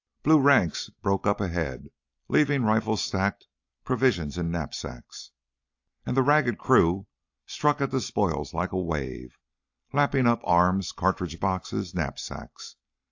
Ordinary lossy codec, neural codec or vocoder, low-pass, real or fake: AAC, 48 kbps; none; 7.2 kHz; real